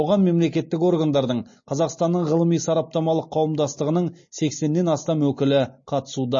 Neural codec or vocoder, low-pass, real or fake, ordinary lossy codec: none; 7.2 kHz; real; MP3, 32 kbps